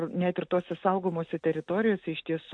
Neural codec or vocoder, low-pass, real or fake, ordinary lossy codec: none; 9.9 kHz; real; AAC, 64 kbps